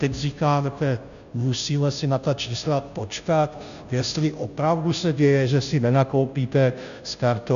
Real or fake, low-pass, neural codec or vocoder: fake; 7.2 kHz; codec, 16 kHz, 0.5 kbps, FunCodec, trained on Chinese and English, 25 frames a second